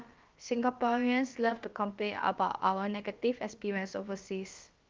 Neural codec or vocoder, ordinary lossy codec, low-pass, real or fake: codec, 16 kHz, about 1 kbps, DyCAST, with the encoder's durations; Opus, 32 kbps; 7.2 kHz; fake